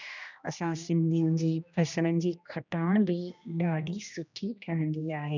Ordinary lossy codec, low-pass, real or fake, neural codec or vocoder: none; 7.2 kHz; fake; codec, 16 kHz, 1 kbps, X-Codec, HuBERT features, trained on general audio